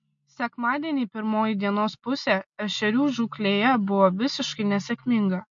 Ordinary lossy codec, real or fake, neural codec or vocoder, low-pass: MP3, 48 kbps; real; none; 7.2 kHz